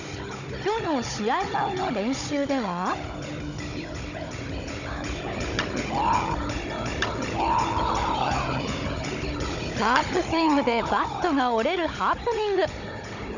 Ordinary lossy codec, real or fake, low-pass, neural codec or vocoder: none; fake; 7.2 kHz; codec, 16 kHz, 16 kbps, FunCodec, trained on Chinese and English, 50 frames a second